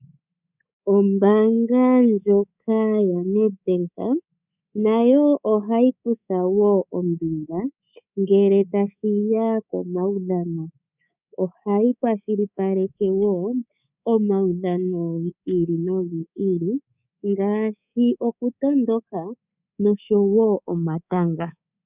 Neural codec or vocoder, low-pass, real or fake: codec, 24 kHz, 3.1 kbps, DualCodec; 3.6 kHz; fake